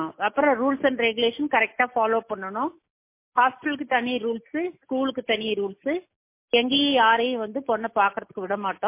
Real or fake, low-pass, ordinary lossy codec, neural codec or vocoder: real; 3.6 kHz; MP3, 24 kbps; none